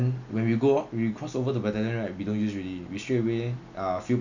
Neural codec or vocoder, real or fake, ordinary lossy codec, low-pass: none; real; none; 7.2 kHz